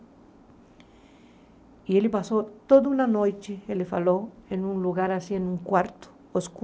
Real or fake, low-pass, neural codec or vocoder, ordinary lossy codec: real; none; none; none